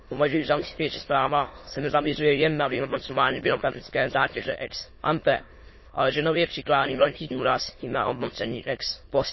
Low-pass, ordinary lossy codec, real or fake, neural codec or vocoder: 7.2 kHz; MP3, 24 kbps; fake; autoencoder, 22.05 kHz, a latent of 192 numbers a frame, VITS, trained on many speakers